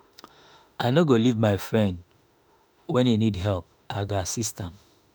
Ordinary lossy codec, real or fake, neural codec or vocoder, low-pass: none; fake; autoencoder, 48 kHz, 32 numbers a frame, DAC-VAE, trained on Japanese speech; none